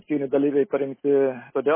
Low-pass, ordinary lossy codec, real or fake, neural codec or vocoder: 3.6 kHz; MP3, 16 kbps; real; none